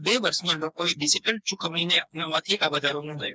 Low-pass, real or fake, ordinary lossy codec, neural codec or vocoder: none; fake; none; codec, 16 kHz, 2 kbps, FreqCodec, smaller model